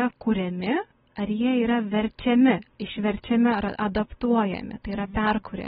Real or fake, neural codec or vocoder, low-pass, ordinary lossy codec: real; none; 7.2 kHz; AAC, 16 kbps